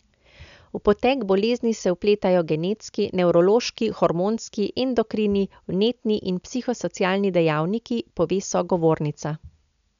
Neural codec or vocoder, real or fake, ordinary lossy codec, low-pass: none; real; none; 7.2 kHz